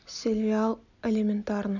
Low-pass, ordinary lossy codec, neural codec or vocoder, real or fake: 7.2 kHz; none; none; real